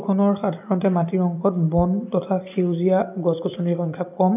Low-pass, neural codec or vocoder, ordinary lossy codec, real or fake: 3.6 kHz; none; none; real